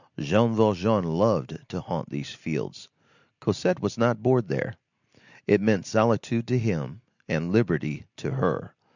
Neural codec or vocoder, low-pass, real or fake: none; 7.2 kHz; real